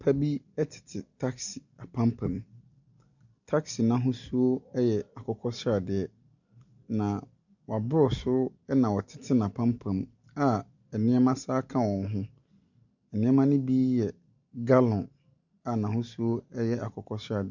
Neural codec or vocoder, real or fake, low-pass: none; real; 7.2 kHz